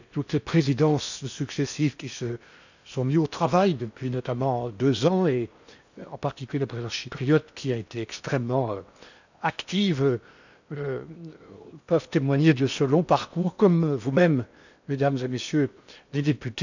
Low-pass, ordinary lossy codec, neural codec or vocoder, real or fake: 7.2 kHz; none; codec, 16 kHz in and 24 kHz out, 0.8 kbps, FocalCodec, streaming, 65536 codes; fake